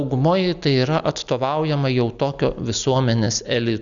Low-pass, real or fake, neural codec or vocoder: 7.2 kHz; real; none